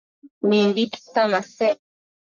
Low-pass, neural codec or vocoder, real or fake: 7.2 kHz; codec, 44.1 kHz, 1.7 kbps, Pupu-Codec; fake